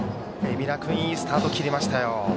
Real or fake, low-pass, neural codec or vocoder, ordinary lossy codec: real; none; none; none